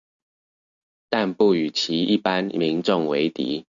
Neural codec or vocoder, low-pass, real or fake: none; 7.2 kHz; real